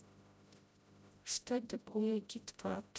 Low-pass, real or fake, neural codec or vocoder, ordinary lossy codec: none; fake; codec, 16 kHz, 0.5 kbps, FreqCodec, smaller model; none